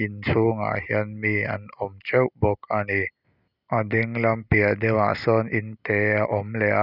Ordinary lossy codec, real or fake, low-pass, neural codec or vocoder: none; real; 5.4 kHz; none